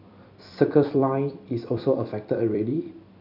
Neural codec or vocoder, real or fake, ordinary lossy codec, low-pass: vocoder, 44.1 kHz, 128 mel bands every 256 samples, BigVGAN v2; fake; none; 5.4 kHz